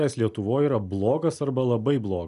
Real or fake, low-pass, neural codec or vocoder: real; 10.8 kHz; none